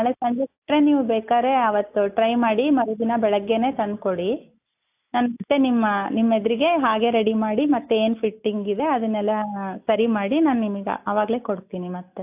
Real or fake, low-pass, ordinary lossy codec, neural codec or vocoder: real; 3.6 kHz; AAC, 32 kbps; none